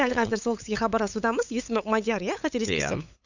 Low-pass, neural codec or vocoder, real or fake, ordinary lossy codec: 7.2 kHz; codec, 16 kHz, 8 kbps, FunCodec, trained on LibriTTS, 25 frames a second; fake; none